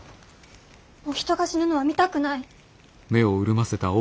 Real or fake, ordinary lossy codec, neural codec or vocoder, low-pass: real; none; none; none